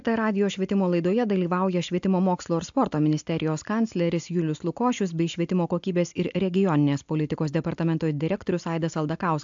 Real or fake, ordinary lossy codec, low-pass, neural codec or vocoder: real; AAC, 64 kbps; 7.2 kHz; none